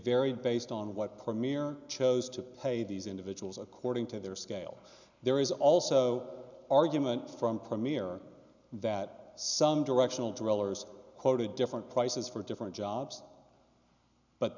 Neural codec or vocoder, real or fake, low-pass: none; real; 7.2 kHz